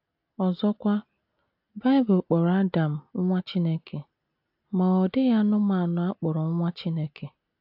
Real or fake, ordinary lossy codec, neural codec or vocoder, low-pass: real; AAC, 48 kbps; none; 5.4 kHz